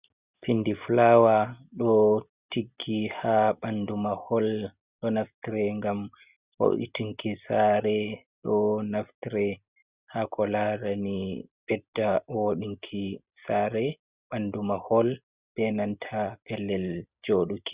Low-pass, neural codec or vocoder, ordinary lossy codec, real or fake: 3.6 kHz; none; Opus, 64 kbps; real